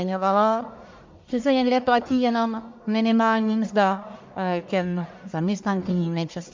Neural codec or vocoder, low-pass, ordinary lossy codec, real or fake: codec, 44.1 kHz, 1.7 kbps, Pupu-Codec; 7.2 kHz; MP3, 64 kbps; fake